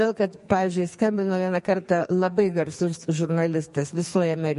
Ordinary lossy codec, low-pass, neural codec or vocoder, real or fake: MP3, 48 kbps; 14.4 kHz; codec, 44.1 kHz, 2.6 kbps, SNAC; fake